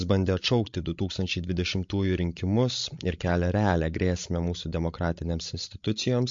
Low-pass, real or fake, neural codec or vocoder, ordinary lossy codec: 7.2 kHz; fake; codec, 16 kHz, 16 kbps, FreqCodec, larger model; MP3, 48 kbps